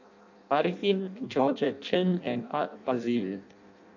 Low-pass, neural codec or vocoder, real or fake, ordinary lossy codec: 7.2 kHz; codec, 16 kHz in and 24 kHz out, 0.6 kbps, FireRedTTS-2 codec; fake; none